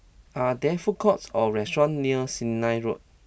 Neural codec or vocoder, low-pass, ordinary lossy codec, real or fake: none; none; none; real